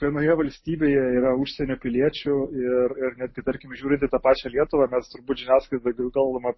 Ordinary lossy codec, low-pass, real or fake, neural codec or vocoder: MP3, 24 kbps; 7.2 kHz; real; none